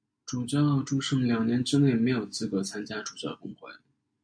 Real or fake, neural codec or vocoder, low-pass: real; none; 9.9 kHz